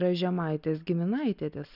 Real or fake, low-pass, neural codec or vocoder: real; 5.4 kHz; none